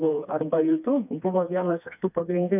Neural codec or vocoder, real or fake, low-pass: codec, 16 kHz, 2 kbps, FreqCodec, smaller model; fake; 3.6 kHz